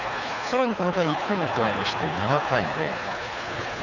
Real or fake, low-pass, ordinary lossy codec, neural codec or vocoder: fake; 7.2 kHz; none; codec, 24 kHz, 3 kbps, HILCodec